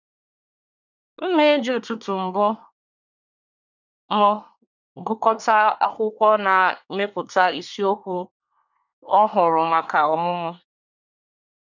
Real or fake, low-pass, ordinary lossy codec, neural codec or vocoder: fake; 7.2 kHz; none; codec, 24 kHz, 1 kbps, SNAC